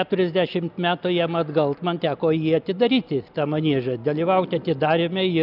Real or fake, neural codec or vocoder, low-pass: real; none; 5.4 kHz